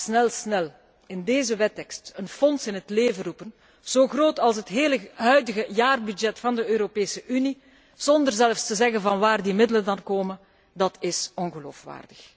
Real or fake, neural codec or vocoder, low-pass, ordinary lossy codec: real; none; none; none